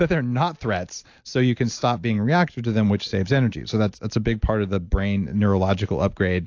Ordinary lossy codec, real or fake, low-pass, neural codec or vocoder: AAC, 48 kbps; real; 7.2 kHz; none